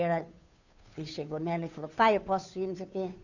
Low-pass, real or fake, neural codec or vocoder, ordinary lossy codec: 7.2 kHz; fake; codec, 16 kHz, 2 kbps, FunCodec, trained on Chinese and English, 25 frames a second; none